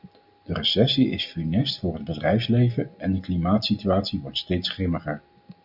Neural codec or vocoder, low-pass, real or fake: none; 5.4 kHz; real